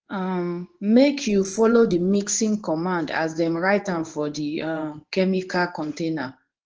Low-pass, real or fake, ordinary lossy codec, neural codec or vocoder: 7.2 kHz; fake; Opus, 16 kbps; codec, 16 kHz in and 24 kHz out, 1 kbps, XY-Tokenizer